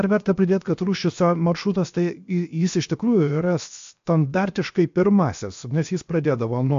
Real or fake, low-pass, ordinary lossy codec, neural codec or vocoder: fake; 7.2 kHz; MP3, 48 kbps; codec, 16 kHz, 0.7 kbps, FocalCodec